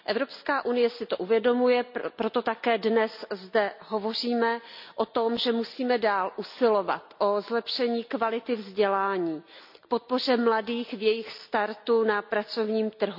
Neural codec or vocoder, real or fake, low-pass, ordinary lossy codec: none; real; 5.4 kHz; none